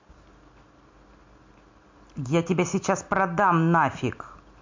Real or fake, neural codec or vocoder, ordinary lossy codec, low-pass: real; none; MP3, 48 kbps; 7.2 kHz